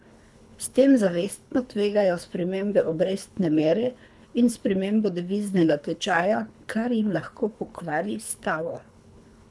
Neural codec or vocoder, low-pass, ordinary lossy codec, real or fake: codec, 24 kHz, 3 kbps, HILCodec; none; none; fake